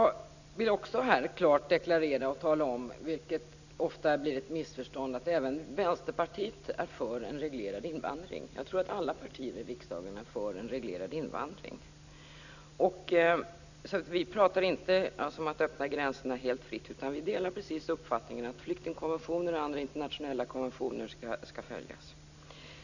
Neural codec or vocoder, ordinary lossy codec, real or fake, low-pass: none; none; real; 7.2 kHz